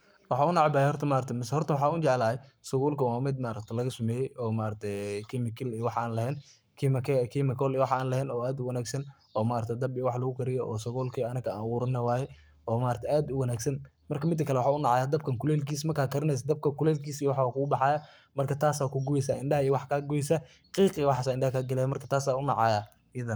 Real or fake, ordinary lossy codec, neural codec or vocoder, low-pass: fake; none; codec, 44.1 kHz, 7.8 kbps, Pupu-Codec; none